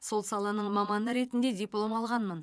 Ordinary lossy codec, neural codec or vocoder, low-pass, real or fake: none; vocoder, 22.05 kHz, 80 mel bands, Vocos; none; fake